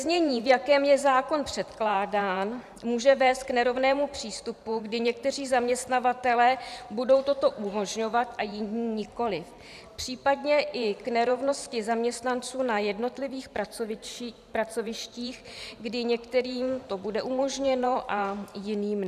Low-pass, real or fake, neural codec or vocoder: 14.4 kHz; fake; vocoder, 44.1 kHz, 128 mel bands every 512 samples, BigVGAN v2